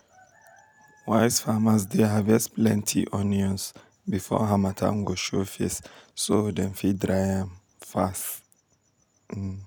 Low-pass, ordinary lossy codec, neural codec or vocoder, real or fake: none; none; none; real